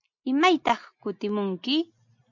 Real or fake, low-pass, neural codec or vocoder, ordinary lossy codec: real; 7.2 kHz; none; MP3, 64 kbps